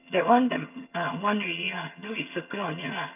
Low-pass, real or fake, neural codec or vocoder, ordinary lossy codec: 3.6 kHz; fake; vocoder, 22.05 kHz, 80 mel bands, HiFi-GAN; none